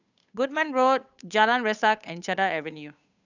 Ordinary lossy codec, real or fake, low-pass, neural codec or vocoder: none; fake; 7.2 kHz; codec, 16 kHz, 8 kbps, FunCodec, trained on Chinese and English, 25 frames a second